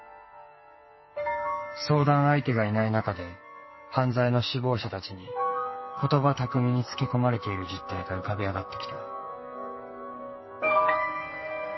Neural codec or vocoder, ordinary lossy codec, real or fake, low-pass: codec, 44.1 kHz, 2.6 kbps, SNAC; MP3, 24 kbps; fake; 7.2 kHz